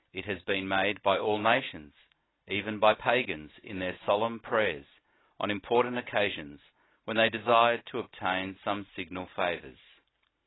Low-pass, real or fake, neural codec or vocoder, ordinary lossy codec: 7.2 kHz; real; none; AAC, 16 kbps